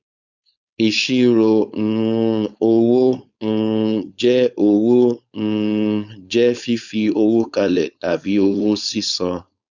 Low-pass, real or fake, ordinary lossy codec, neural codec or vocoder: 7.2 kHz; fake; none; codec, 16 kHz, 4.8 kbps, FACodec